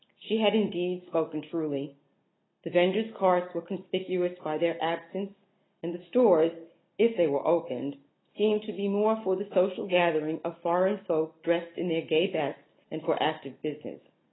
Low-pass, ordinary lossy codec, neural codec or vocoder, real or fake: 7.2 kHz; AAC, 16 kbps; none; real